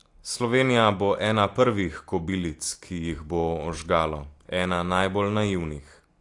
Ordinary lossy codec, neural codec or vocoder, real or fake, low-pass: AAC, 48 kbps; none; real; 10.8 kHz